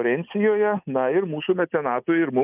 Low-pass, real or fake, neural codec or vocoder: 3.6 kHz; real; none